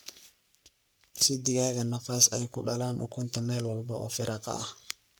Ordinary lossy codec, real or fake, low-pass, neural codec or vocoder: none; fake; none; codec, 44.1 kHz, 3.4 kbps, Pupu-Codec